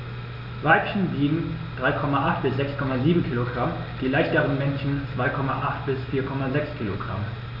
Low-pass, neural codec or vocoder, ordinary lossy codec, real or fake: 5.4 kHz; none; none; real